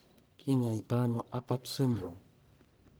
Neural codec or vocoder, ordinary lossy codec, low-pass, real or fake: codec, 44.1 kHz, 1.7 kbps, Pupu-Codec; none; none; fake